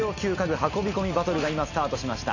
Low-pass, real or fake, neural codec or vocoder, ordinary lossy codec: 7.2 kHz; real; none; none